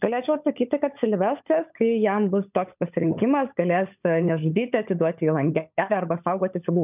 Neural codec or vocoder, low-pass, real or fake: codec, 16 kHz, 16 kbps, FunCodec, trained on LibriTTS, 50 frames a second; 3.6 kHz; fake